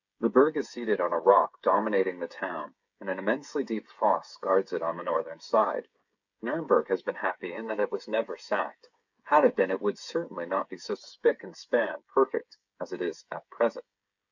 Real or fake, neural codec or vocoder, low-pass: fake; codec, 16 kHz, 16 kbps, FreqCodec, smaller model; 7.2 kHz